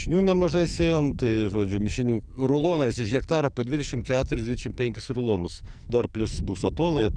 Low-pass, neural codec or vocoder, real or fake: 9.9 kHz; codec, 44.1 kHz, 2.6 kbps, SNAC; fake